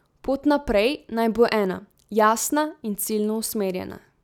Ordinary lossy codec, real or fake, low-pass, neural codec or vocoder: none; real; 19.8 kHz; none